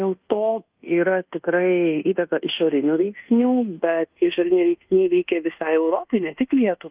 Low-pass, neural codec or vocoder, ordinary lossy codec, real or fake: 3.6 kHz; codec, 24 kHz, 1.2 kbps, DualCodec; Opus, 32 kbps; fake